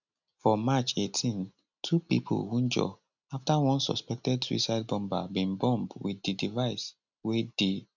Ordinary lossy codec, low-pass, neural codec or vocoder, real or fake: none; 7.2 kHz; none; real